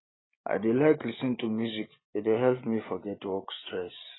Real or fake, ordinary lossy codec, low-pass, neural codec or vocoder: real; AAC, 16 kbps; 7.2 kHz; none